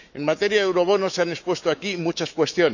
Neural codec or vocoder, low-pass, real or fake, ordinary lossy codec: autoencoder, 48 kHz, 128 numbers a frame, DAC-VAE, trained on Japanese speech; 7.2 kHz; fake; none